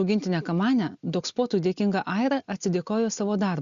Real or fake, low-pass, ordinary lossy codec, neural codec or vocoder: real; 7.2 kHz; Opus, 64 kbps; none